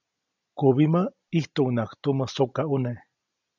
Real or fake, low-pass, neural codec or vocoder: real; 7.2 kHz; none